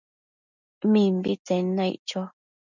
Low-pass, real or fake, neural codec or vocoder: 7.2 kHz; real; none